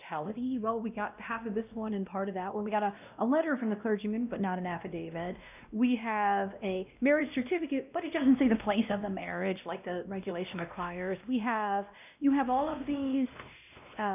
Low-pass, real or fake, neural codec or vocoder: 3.6 kHz; fake; codec, 16 kHz, 1 kbps, X-Codec, WavLM features, trained on Multilingual LibriSpeech